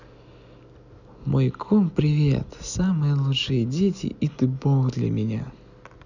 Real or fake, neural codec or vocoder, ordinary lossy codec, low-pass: real; none; none; 7.2 kHz